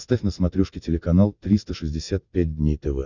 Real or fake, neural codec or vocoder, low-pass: real; none; 7.2 kHz